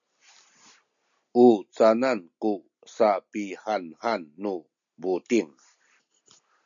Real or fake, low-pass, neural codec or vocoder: real; 7.2 kHz; none